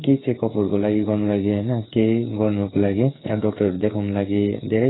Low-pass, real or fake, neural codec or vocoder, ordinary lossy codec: 7.2 kHz; fake; codec, 16 kHz, 8 kbps, FreqCodec, smaller model; AAC, 16 kbps